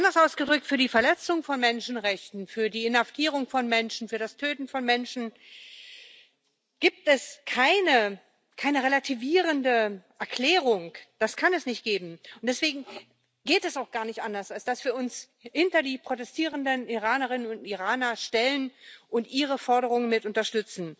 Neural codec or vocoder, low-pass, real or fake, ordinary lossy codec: none; none; real; none